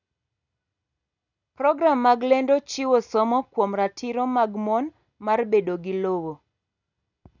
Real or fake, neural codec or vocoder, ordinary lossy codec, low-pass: real; none; none; 7.2 kHz